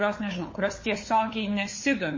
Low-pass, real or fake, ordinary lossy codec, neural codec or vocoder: 7.2 kHz; fake; MP3, 32 kbps; codec, 16 kHz, 4 kbps, FunCodec, trained on LibriTTS, 50 frames a second